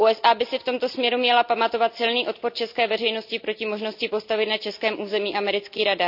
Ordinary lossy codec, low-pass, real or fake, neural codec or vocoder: none; 5.4 kHz; real; none